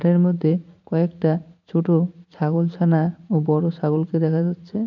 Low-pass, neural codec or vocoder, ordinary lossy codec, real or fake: 7.2 kHz; vocoder, 44.1 kHz, 80 mel bands, Vocos; none; fake